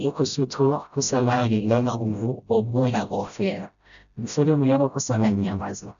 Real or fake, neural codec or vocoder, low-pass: fake; codec, 16 kHz, 0.5 kbps, FreqCodec, smaller model; 7.2 kHz